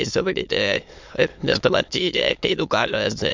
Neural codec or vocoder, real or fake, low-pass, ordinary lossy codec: autoencoder, 22.05 kHz, a latent of 192 numbers a frame, VITS, trained on many speakers; fake; 7.2 kHz; MP3, 64 kbps